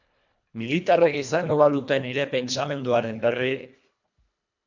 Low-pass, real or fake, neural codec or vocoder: 7.2 kHz; fake; codec, 24 kHz, 1.5 kbps, HILCodec